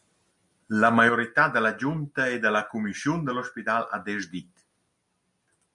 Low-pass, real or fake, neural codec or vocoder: 10.8 kHz; real; none